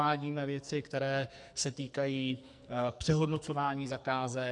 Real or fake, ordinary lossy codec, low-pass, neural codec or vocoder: fake; AAC, 64 kbps; 10.8 kHz; codec, 44.1 kHz, 2.6 kbps, SNAC